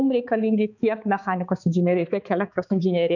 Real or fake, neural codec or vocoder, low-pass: fake; codec, 16 kHz, 4 kbps, X-Codec, HuBERT features, trained on balanced general audio; 7.2 kHz